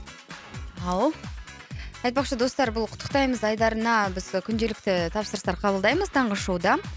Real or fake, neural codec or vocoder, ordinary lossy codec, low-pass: real; none; none; none